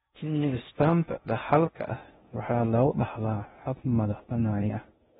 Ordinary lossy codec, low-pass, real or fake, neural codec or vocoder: AAC, 16 kbps; 10.8 kHz; fake; codec, 16 kHz in and 24 kHz out, 0.6 kbps, FocalCodec, streaming, 2048 codes